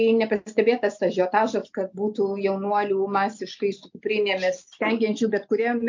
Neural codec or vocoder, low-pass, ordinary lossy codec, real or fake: none; 7.2 kHz; MP3, 64 kbps; real